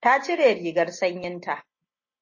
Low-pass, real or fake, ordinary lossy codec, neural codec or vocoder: 7.2 kHz; real; MP3, 32 kbps; none